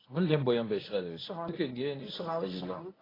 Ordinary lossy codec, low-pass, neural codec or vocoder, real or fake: AAC, 24 kbps; 5.4 kHz; codec, 24 kHz, 0.9 kbps, WavTokenizer, medium speech release version 1; fake